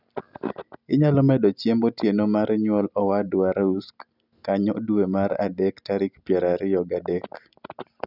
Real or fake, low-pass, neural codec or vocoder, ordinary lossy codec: real; 5.4 kHz; none; none